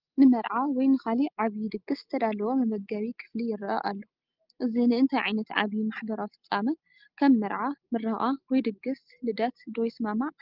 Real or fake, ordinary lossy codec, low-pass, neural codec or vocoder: real; Opus, 32 kbps; 5.4 kHz; none